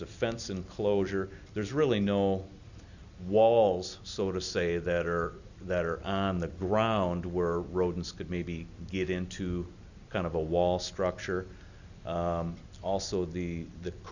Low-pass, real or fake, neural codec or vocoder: 7.2 kHz; real; none